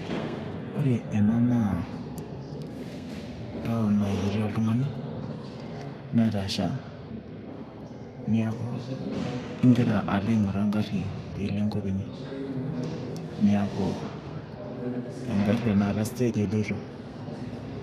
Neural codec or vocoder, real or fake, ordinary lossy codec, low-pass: codec, 32 kHz, 1.9 kbps, SNAC; fake; none; 14.4 kHz